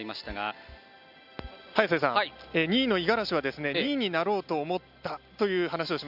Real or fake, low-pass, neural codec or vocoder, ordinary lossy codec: real; 5.4 kHz; none; AAC, 48 kbps